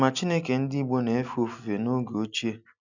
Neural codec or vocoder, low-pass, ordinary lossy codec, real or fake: none; 7.2 kHz; none; real